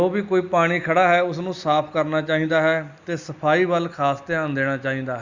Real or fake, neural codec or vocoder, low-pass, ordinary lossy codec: real; none; 7.2 kHz; Opus, 64 kbps